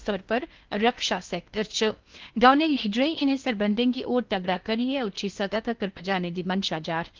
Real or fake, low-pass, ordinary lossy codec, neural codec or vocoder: fake; 7.2 kHz; Opus, 32 kbps; codec, 16 kHz in and 24 kHz out, 0.8 kbps, FocalCodec, streaming, 65536 codes